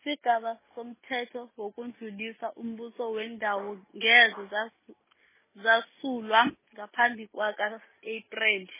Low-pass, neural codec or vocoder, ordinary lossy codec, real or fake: 3.6 kHz; none; MP3, 16 kbps; real